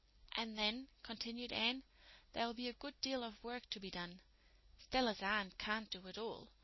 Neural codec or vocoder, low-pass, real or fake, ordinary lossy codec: none; 7.2 kHz; real; MP3, 24 kbps